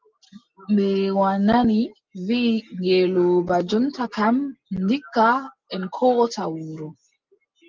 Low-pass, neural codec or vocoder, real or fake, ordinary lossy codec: 7.2 kHz; none; real; Opus, 32 kbps